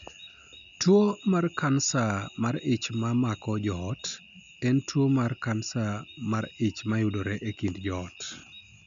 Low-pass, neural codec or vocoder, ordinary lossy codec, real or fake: 7.2 kHz; none; none; real